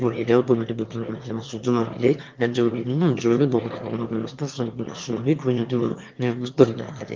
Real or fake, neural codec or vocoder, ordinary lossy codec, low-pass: fake; autoencoder, 22.05 kHz, a latent of 192 numbers a frame, VITS, trained on one speaker; Opus, 24 kbps; 7.2 kHz